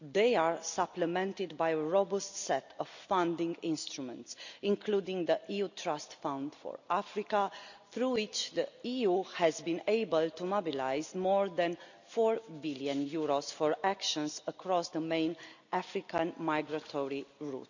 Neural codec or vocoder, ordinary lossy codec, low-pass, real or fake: none; none; 7.2 kHz; real